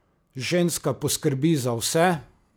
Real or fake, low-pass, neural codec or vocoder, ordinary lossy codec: real; none; none; none